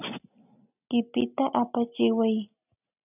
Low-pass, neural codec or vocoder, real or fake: 3.6 kHz; none; real